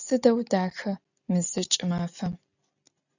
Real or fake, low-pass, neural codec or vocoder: real; 7.2 kHz; none